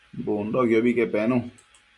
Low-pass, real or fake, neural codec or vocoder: 10.8 kHz; real; none